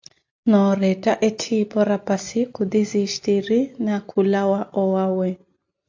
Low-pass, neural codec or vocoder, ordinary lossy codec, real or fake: 7.2 kHz; none; AAC, 48 kbps; real